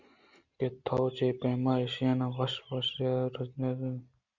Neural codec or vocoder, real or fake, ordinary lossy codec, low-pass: none; real; Opus, 64 kbps; 7.2 kHz